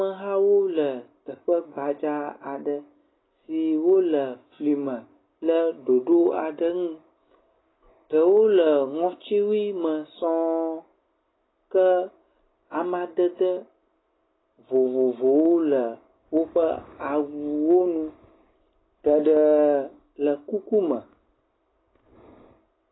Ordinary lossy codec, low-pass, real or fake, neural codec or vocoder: AAC, 16 kbps; 7.2 kHz; real; none